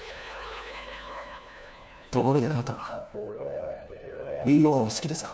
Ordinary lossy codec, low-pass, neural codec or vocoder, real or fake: none; none; codec, 16 kHz, 1 kbps, FunCodec, trained on LibriTTS, 50 frames a second; fake